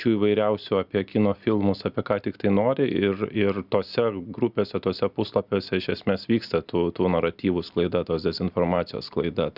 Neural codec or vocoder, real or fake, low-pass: none; real; 5.4 kHz